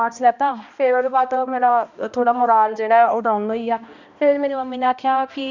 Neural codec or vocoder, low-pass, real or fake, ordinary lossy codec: codec, 16 kHz, 1 kbps, X-Codec, HuBERT features, trained on balanced general audio; 7.2 kHz; fake; none